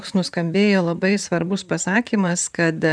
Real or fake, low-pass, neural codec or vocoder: fake; 9.9 kHz; vocoder, 44.1 kHz, 128 mel bands, Pupu-Vocoder